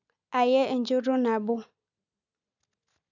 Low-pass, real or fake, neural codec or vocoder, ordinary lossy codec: 7.2 kHz; real; none; none